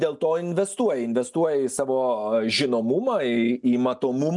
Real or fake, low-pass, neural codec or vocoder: real; 10.8 kHz; none